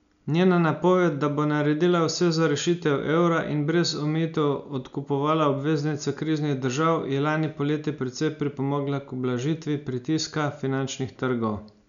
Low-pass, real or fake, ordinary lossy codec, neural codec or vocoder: 7.2 kHz; real; none; none